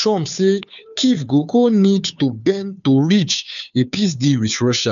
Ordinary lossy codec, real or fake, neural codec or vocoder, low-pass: none; fake; codec, 16 kHz, 2 kbps, FunCodec, trained on Chinese and English, 25 frames a second; 7.2 kHz